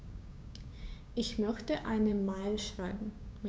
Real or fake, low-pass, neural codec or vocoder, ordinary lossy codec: fake; none; codec, 16 kHz, 6 kbps, DAC; none